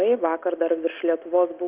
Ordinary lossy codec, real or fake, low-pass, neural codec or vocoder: Opus, 32 kbps; real; 3.6 kHz; none